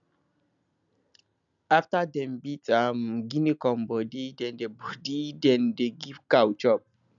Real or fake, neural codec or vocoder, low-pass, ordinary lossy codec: real; none; 7.2 kHz; none